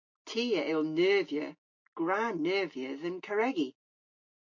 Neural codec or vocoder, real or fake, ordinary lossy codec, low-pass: none; real; MP3, 48 kbps; 7.2 kHz